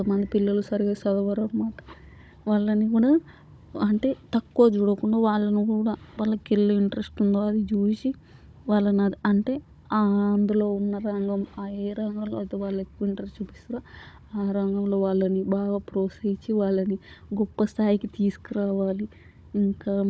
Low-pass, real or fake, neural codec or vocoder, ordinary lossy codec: none; fake; codec, 16 kHz, 16 kbps, FunCodec, trained on Chinese and English, 50 frames a second; none